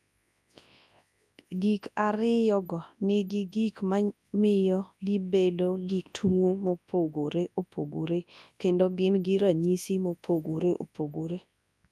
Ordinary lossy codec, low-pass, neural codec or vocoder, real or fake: none; none; codec, 24 kHz, 0.9 kbps, WavTokenizer, large speech release; fake